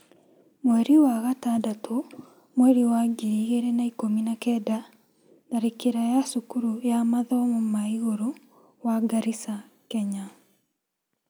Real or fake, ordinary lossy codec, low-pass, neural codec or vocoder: real; none; none; none